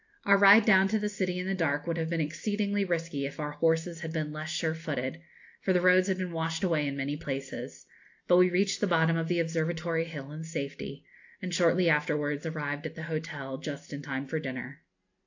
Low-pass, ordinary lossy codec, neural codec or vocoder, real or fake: 7.2 kHz; AAC, 48 kbps; none; real